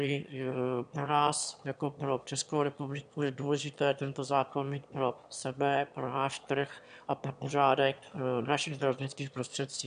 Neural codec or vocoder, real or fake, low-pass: autoencoder, 22.05 kHz, a latent of 192 numbers a frame, VITS, trained on one speaker; fake; 9.9 kHz